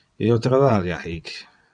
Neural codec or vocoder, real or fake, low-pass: vocoder, 22.05 kHz, 80 mel bands, WaveNeXt; fake; 9.9 kHz